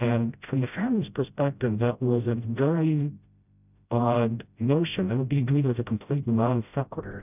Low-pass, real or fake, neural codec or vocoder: 3.6 kHz; fake; codec, 16 kHz, 0.5 kbps, FreqCodec, smaller model